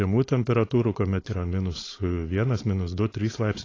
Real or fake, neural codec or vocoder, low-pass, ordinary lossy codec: fake; codec, 16 kHz, 4.8 kbps, FACodec; 7.2 kHz; AAC, 32 kbps